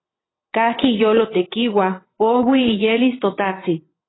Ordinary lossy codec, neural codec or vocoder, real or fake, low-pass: AAC, 16 kbps; vocoder, 22.05 kHz, 80 mel bands, WaveNeXt; fake; 7.2 kHz